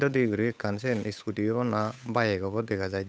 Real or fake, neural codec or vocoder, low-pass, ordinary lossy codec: fake; codec, 16 kHz, 8 kbps, FunCodec, trained on Chinese and English, 25 frames a second; none; none